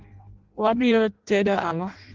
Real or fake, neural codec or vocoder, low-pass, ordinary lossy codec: fake; codec, 16 kHz in and 24 kHz out, 0.6 kbps, FireRedTTS-2 codec; 7.2 kHz; Opus, 16 kbps